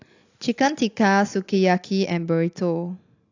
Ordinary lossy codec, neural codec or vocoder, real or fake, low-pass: AAC, 48 kbps; none; real; 7.2 kHz